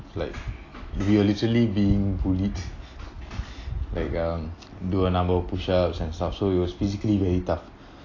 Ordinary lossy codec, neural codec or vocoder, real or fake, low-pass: AAC, 32 kbps; none; real; 7.2 kHz